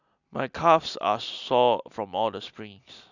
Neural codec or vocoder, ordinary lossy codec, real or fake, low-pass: none; none; real; 7.2 kHz